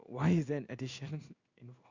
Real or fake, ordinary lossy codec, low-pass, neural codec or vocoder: fake; Opus, 64 kbps; 7.2 kHz; codec, 16 kHz in and 24 kHz out, 1 kbps, XY-Tokenizer